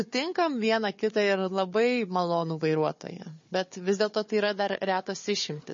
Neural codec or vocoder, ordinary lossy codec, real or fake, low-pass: codec, 16 kHz, 4 kbps, FunCodec, trained on Chinese and English, 50 frames a second; MP3, 32 kbps; fake; 7.2 kHz